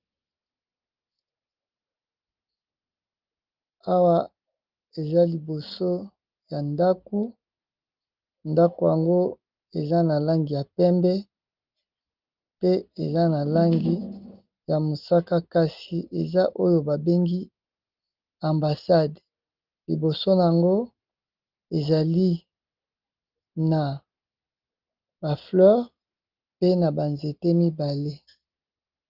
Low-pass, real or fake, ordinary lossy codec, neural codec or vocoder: 5.4 kHz; real; Opus, 24 kbps; none